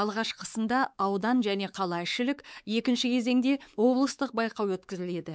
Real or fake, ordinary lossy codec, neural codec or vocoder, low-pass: fake; none; codec, 16 kHz, 4 kbps, X-Codec, WavLM features, trained on Multilingual LibriSpeech; none